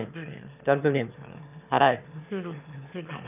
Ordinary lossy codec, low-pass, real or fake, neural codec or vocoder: none; 3.6 kHz; fake; autoencoder, 22.05 kHz, a latent of 192 numbers a frame, VITS, trained on one speaker